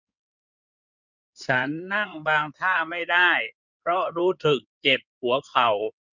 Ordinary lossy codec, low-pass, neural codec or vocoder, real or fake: none; 7.2 kHz; codec, 16 kHz in and 24 kHz out, 2.2 kbps, FireRedTTS-2 codec; fake